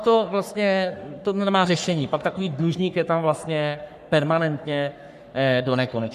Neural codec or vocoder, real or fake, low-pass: codec, 44.1 kHz, 3.4 kbps, Pupu-Codec; fake; 14.4 kHz